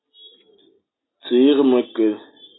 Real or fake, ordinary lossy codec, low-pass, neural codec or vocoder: real; AAC, 16 kbps; 7.2 kHz; none